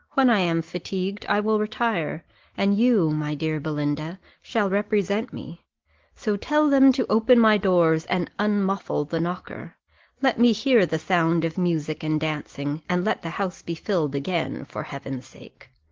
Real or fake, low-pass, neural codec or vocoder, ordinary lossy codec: real; 7.2 kHz; none; Opus, 16 kbps